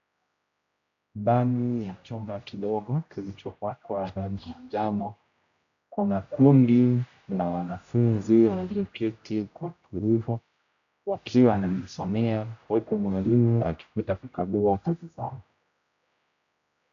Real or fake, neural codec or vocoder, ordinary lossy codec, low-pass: fake; codec, 16 kHz, 0.5 kbps, X-Codec, HuBERT features, trained on general audio; AAC, 96 kbps; 7.2 kHz